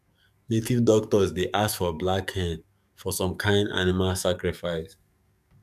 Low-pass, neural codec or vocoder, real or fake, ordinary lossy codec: 14.4 kHz; codec, 44.1 kHz, 7.8 kbps, DAC; fake; none